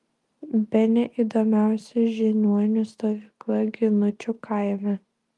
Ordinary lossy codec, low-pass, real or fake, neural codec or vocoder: Opus, 32 kbps; 10.8 kHz; real; none